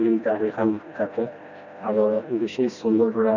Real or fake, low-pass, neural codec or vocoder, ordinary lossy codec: fake; 7.2 kHz; codec, 16 kHz, 1 kbps, FreqCodec, smaller model; none